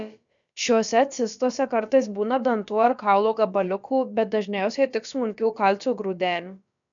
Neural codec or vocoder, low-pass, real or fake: codec, 16 kHz, about 1 kbps, DyCAST, with the encoder's durations; 7.2 kHz; fake